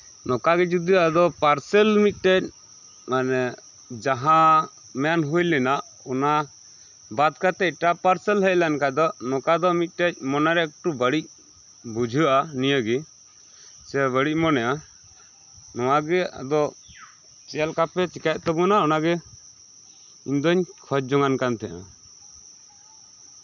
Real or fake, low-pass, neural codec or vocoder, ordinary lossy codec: real; 7.2 kHz; none; none